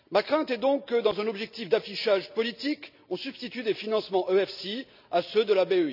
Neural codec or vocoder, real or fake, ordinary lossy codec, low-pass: none; real; none; 5.4 kHz